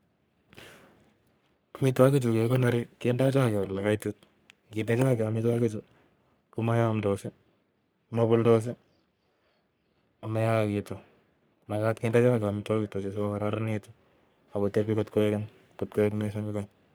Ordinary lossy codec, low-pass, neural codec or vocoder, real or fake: none; none; codec, 44.1 kHz, 3.4 kbps, Pupu-Codec; fake